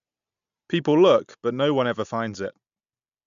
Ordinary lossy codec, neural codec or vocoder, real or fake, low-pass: none; none; real; 7.2 kHz